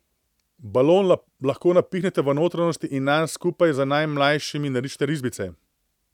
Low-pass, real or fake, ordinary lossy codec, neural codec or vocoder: 19.8 kHz; real; none; none